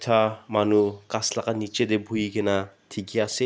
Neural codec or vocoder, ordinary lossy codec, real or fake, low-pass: none; none; real; none